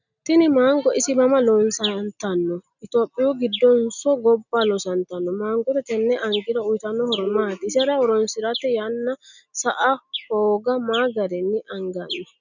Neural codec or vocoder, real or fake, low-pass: none; real; 7.2 kHz